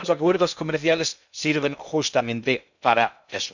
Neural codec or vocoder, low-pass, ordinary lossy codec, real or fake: codec, 16 kHz in and 24 kHz out, 0.6 kbps, FocalCodec, streaming, 2048 codes; 7.2 kHz; none; fake